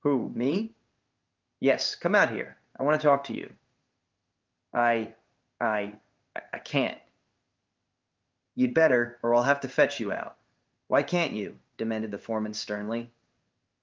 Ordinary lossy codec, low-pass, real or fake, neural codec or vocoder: Opus, 24 kbps; 7.2 kHz; fake; codec, 16 kHz in and 24 kHz out, 1 kbps, XY-Tokenizer